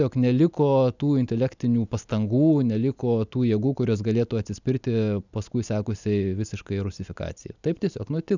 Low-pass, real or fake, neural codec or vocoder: 7.2 kHz; real; none